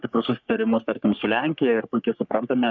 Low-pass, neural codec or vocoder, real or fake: 7.2 kHz; codec, 44.1 kHz, 3.4 kbps, Pupu-Codec; fake